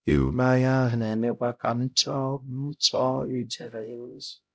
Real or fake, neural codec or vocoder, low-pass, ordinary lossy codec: fake; codec, 16 kHz, 0.5 kbps, X-Codec, HuBERT features, trained on LibriSpeech; none; none